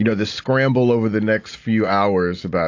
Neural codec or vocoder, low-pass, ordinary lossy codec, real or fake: none; 7.2 kHz; AAC, 48 kbps; real